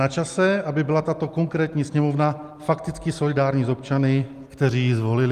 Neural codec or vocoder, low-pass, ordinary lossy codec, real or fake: none; 14.4 kHz; Opus, 32 kbps; real